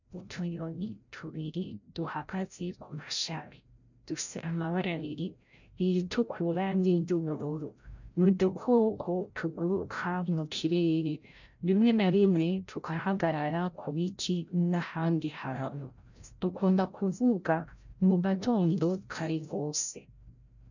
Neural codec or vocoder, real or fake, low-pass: codec, 16 kHz, 0.5 kbps, FreqCodec, larger model; fake; 7.2 kHz